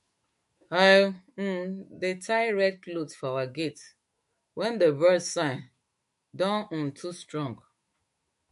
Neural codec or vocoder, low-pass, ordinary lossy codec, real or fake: autoencoder, 48 kHz, 128 numbers a frame, DAC-VAE, trained on Japanese speech; 14.4 kHz; MP3, 48 kbps; fake